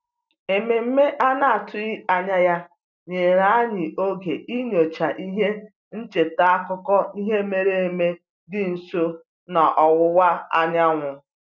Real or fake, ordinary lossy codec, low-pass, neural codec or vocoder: real; none; 7.2 kHz; none